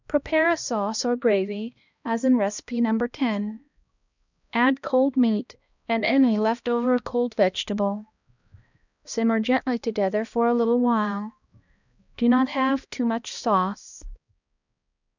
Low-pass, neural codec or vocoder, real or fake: 7.2 kHz; codec, 16 kHz, 1 kbps, X-Codec, HuBERT features, trained on balanced general audio; fake